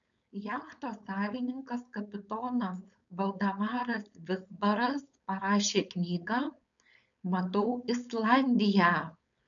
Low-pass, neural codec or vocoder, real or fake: 7.2 kHz; codec, 16 kHz, 4.8 kbps, FACodec; fake